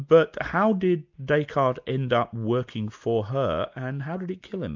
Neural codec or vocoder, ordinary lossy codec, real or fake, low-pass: none; MP3, 64 kbps; real; 7.2 kHz